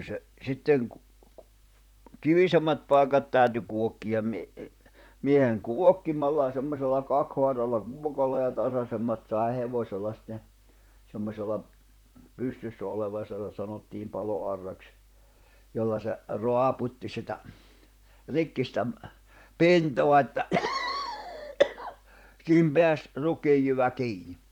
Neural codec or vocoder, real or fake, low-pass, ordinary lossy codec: vocoder, 44.1 kHz, 128 mel bands, Pupu-Vocoder; fake; 19.8 kHz; none